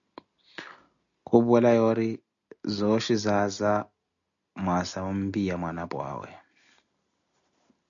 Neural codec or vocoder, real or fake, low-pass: none; real; 7.2 kHz